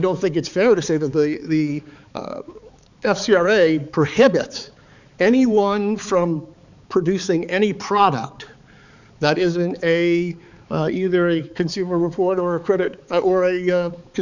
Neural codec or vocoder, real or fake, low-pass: codec, 16 kHz, 4 kbps, X-Codec, HuBERT features, trained on balanced general audio; fake; 7.2 kHz